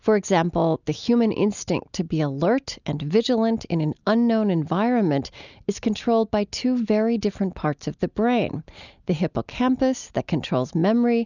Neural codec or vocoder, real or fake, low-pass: none; real; 7.2 kHz